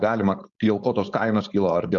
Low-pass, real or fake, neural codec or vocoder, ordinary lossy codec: 7.2 kHz; fake; codec, 16 kHz, 4.8 kbps, FACodec; Opus, 64 kbps